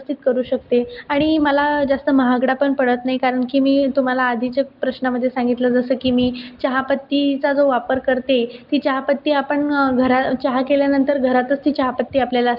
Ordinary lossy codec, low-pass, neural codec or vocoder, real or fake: Opus, 32 kbps; 5.4 kHz; none; real